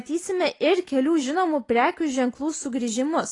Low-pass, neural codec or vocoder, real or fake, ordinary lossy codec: 10.8 kHz; none; real; AAC, 32 kbps